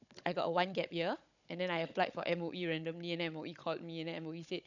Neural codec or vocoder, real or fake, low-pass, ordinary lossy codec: codec, 16 kHz, 16 kbps, FunCodec, trained on Chinese and English, 50 frames a second; fake; 7.2 kHz; none